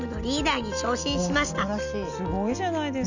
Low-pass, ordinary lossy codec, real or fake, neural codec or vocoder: 7.2 kHz; none; real; none